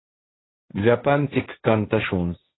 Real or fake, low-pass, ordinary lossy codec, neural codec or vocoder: fake; 7.2 kHz; AAC, 16 kbps; codec, 16 kHz, 1.1 kbps, Voila-Tokenizer